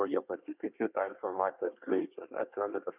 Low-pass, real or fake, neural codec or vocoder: 3.6 kHz; fake; codec, 24 kHz, 1 kbps, SNAC